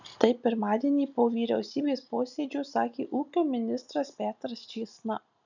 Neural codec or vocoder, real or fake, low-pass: none; real; 7.2 kHz